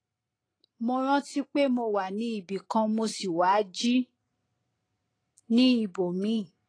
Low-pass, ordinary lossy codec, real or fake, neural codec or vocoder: 9.9 kHz; AAC, 32 kbps; fake; vocoder, 44.1 kHz, 128 mel bands every 512 samples, BigVGAN v2